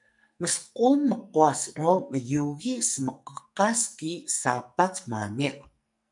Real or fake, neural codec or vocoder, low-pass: fake; codec, 44.1 kHz, 2.6 kbps, SNAC; 10.8 kHz